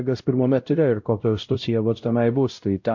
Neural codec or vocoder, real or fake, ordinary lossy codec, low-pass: codec, 16 kHz, 0.5 kbps, X-Codec, WavLM features, trained on Multilingual LibriSpeech; fake; MP3, 48 kbps; 7.2 kHz